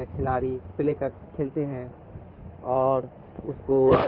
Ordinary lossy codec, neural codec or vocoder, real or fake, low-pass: Opus, 24 kbps; codec, 16 kHz in and 24 kHz out, 2.2 kbps, FireRedTTS-2 codec; fake; 5.4 kHz